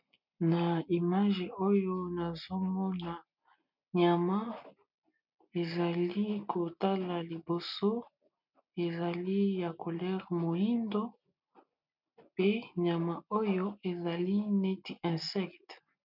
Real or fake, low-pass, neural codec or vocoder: real; 5.4 kHz; none